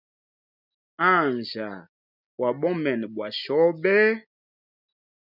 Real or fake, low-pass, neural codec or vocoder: real; 5.4 kHz; none